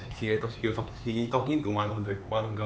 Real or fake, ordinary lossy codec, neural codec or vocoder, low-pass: fake; none; codec, 16 kHz, 4 kbps, X-Codec, HuBERT features, trained on LibriSpeech; none